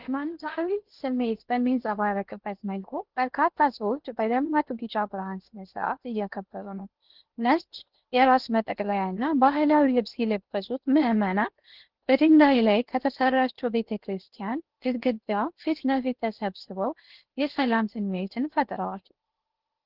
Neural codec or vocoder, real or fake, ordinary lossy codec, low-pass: codec, 16 kHz in and 24 kHz out, 0.8 kbps, FocalCodec, streaming, 65536 codes; fake; Opus, 16 kbps; 5.4 kHz